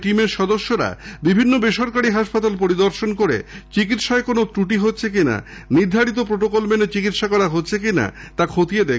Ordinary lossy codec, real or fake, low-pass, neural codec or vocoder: none; real; none; none